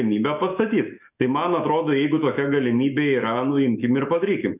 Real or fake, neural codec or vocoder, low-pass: fake; autoencoder, 48 kHz, 128 numbers a frame, DAC-VAE, trained on Japanese speech; 3.6 kHz